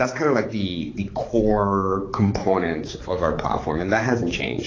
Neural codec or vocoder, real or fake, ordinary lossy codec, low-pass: codec, 16 kHz, 4 kbps, X-Codec, HuBERT features, trained on balanced general audio; fake; AAC, 32 kbps; 7.2 kHz